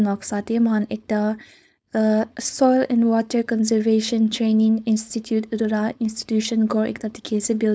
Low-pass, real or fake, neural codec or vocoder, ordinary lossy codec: none; fake; codec, 16 kHz, 4.8 kbps, FACodec; none